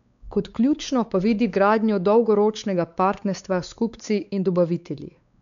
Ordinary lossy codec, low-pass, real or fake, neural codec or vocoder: none; 7.2 kHz; fake; codec, 16 kHz, 4 kbps, X-Codec, WavLM features, trained on Multilingual LibriSpeech